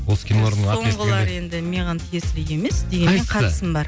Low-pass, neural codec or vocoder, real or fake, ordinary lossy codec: none; none; real; none